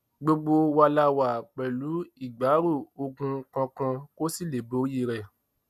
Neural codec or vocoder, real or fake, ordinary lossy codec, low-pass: none; real; none; 14.4 kHz